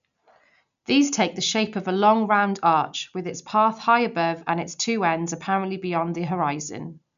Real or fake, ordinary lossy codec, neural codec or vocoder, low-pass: real; none; none; 7.2 kHz